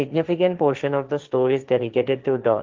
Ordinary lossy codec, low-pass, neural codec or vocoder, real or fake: Opus, 32 kbps; 7.2 kHz; codec, 16 kHz, 1.1 kbps, Voila-Tokenizer; fake